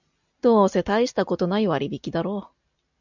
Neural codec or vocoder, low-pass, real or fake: none; 7.2 kHz; real